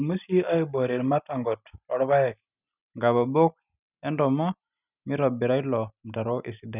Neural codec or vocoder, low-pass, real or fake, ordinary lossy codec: none; 3.6 kHz; real; none